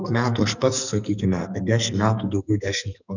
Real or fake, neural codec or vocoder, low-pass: fake; codec, 44.1 kHz, 2.6 kbps, SNAC; 7.2 kHz